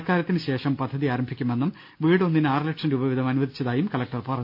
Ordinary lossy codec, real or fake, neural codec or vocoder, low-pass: none; real; none; 5.4 kHz